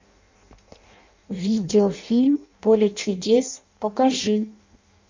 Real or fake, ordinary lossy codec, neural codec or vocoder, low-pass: fake; MP3, 64 kbps; codec, 16 kHz in and 24 kHz out, 0.6 kbps, FireRedTTS-2 codec; 7.2 kHz